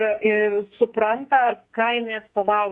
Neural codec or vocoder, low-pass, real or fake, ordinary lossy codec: codec, 44.1 kHz, 2.6 kbps, SNAC; 10.8 kHz; fake; Opus, 64 kbps